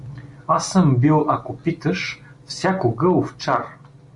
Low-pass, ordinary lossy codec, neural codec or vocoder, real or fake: 10.8 kHz; Opus, 64 kbps; none; real